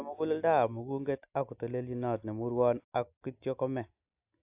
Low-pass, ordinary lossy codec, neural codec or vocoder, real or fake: 3.6 kHz; AAC, 32 kbps; none; real